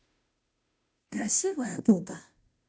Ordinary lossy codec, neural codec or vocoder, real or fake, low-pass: none; codec, 16 kHz, 0.5 kbps, FunCodec, trained on Chinese and English, 25 frames a second; fake; none